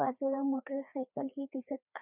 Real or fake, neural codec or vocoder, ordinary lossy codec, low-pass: fake; codec, 16 kHz, 2 kbps, FreqCodec, larger model; none; 3.6 kHz